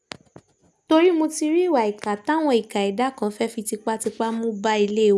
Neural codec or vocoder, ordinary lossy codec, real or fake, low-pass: none; none; real; none